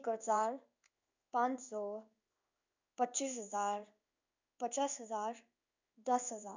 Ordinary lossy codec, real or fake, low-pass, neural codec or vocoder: none; fake; 7.2 kHz; codec, 16 kHz in and 24 kHz out, 1 kbps, XY-Tokenizer